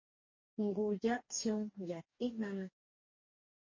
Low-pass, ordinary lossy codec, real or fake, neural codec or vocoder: 7.2 kHz; MP3, 32 kbps; fake; codec, 44.1 kHz, 2.6 kbps, DAC